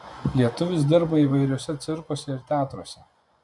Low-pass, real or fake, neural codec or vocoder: 10.8 kHz; fake; vocoder, 24 kHz, 100 mel bands, Vocos